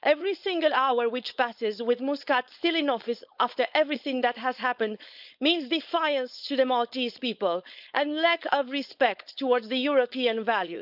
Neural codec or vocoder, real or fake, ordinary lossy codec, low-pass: codec, 16 kHz, 4.8 kbps, FACodec; fake; none; 5.4 kHz